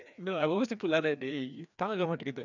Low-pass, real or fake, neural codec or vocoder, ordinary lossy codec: 7.2 kHz; fake; codec, 16 kHz, 2 kbps, FreqCodec, larger model; none